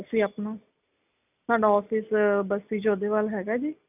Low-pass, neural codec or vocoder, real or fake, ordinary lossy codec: 3.6 kHz; none; real; none